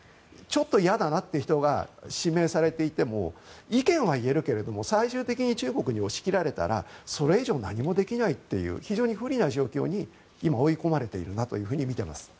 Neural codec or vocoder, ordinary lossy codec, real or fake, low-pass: none; none; real; none